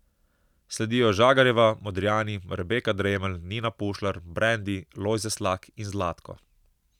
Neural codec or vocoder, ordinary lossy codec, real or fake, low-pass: none; none; real; 19.8 kHz